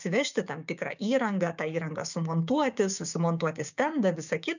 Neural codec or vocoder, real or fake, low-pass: codec, 16 kHz, 6 kbps, DAC; fake; 7.2 kHz